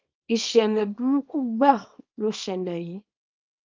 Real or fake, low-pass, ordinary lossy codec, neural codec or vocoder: fake; 7.2 kHz; Opus, 24 kbps; codec, 24 kHz, 0.9 kbps, WavTokenizer, small release